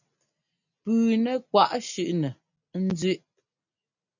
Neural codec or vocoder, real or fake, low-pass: none; real; 7.2 kHz